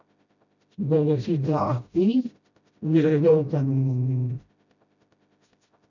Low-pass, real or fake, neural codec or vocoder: 7.2 kHz; fake; codec, 16 kHz, 0.5 kbps, FreqCodec, smaller model